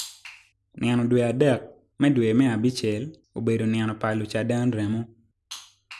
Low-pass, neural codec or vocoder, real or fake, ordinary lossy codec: none; none; real; none